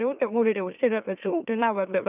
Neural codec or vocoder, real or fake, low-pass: autoencoder, 44.1 kHz, a latent of 192 numbers a frame, MeloTTS; fake; 3.6 kHz